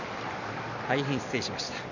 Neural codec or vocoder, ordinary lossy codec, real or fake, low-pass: vocoder, 44.1 kHz, 128 mel bands every 256 samples, BigVGAN v2; none; fake; 7.2 kHz